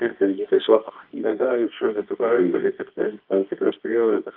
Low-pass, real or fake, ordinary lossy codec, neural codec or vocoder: 5.4 kHz; fake; Opus, 32 kbps; codec, 24 kHz, 0.9 kbps, WavTokenizer, medium music audio release